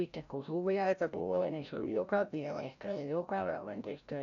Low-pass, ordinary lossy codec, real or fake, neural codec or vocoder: 7.2 kHz; none; fake; codec, 16 kHz, 0.5 kbps, FreqCodec, larger model